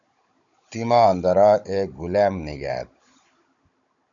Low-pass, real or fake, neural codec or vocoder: 7.2 kHz; fake; codec, 16 kHz, 16 kbps, FunCodec, trained on Chinese and English, 50 frames a second